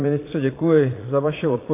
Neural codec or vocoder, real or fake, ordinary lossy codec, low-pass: vocoder, 22.05 kHz, 80 mel bands, Vocos; fake; AAC, 24 kbps; 3.6 kHz